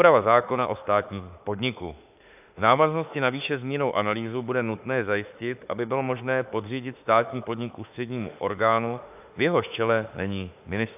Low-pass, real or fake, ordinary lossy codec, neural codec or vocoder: 3.6 kHz; fake; AAC, 32 kbps; autoencoder, 48 kHz, 32 numbers a frame, DAC-VAE, trained on Japanese speech